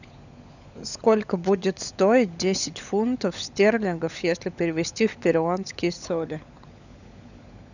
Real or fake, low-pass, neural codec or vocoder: fake; 7.2 kHz; codec, 16 kHz, 16 kbps, FunCodec, trained on LibriTTS, 50 frames a second